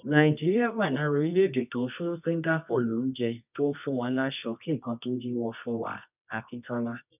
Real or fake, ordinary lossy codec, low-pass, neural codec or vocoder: fake; none; 3.6 kHz; codec, 24 kHz, 0.9 kbps, WavTokenizer, medium music audio release